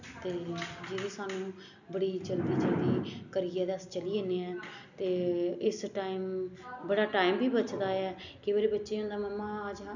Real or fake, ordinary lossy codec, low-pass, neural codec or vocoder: real; none; 7.2 kHz; none